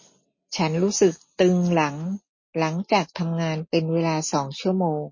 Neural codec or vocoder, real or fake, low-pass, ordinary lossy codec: none; real; 7.2 kHz; MP3, 32 kbps